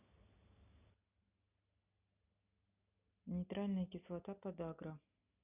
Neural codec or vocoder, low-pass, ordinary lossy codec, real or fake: none; 3.6 kHz; none; real